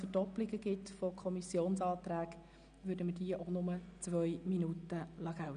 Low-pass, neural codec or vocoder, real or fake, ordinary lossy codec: 9.9 kHz; none; real; none